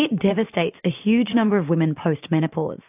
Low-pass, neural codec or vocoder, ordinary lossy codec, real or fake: 3.6 kHz; vocoder, 44.1 kHz, 128 mel bands every 512 samples, BigVGAN v2; AAC, 32 kbps; fake